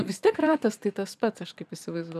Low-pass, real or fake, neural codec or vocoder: 14.4 kHz; fake; vocoder, 44.1 kHz, 128 mel bands every 512 samples, BigVGAN v2